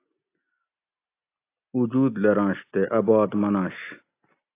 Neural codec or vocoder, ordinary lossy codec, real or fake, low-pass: none; AAC, 24 kbps; real; 3.6 kHz